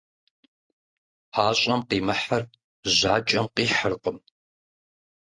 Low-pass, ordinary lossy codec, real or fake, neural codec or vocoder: 9.9 kHz; AAC, 48 kbps; real; none